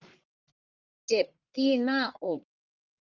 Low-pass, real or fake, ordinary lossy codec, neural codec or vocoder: 7.2 kHz; fake; Opus, 24 kbps; codec, 44.1 kHz, 1.7 kbps, Pupu-Codec